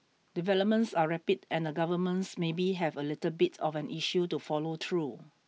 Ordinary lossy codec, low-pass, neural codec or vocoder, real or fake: none; none; none; real